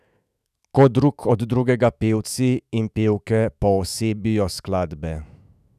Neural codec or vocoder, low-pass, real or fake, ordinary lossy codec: autoencoder, 48 kHz, 128 numbers a frame, DAC-VAE, trained on Japanese speech; 14.4 kHz; fake; none